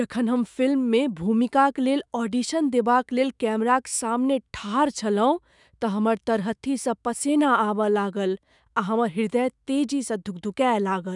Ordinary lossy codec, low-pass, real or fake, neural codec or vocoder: none; 10.8 kHz; fake; autoencoder, 48 kHz, 128 numbers a frame, DAC-VAE, trained on Japanese speech